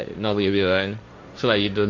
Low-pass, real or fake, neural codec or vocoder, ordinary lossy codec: 7.2 kHz; fake; codec, 16 kHz, 1.1 kbps, Voila-Tokenizer; MP3, 48 kbps